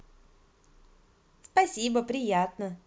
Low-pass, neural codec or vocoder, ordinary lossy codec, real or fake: none; none; none; real